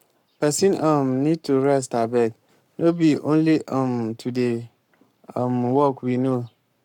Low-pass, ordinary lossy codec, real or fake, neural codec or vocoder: 19.8 kHz; none; fake; codec, 44.1 kHz, 7.8 kbps, Pupu-Codec